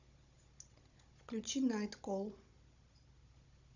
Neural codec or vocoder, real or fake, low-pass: codec, 16 kHz, 16 kbps, FunCodec, trained on Chinese and English, 50 frames a second; fake; 7.2 kHz